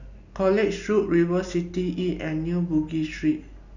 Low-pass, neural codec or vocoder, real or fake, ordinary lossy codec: 7.2 kHz; none; real; none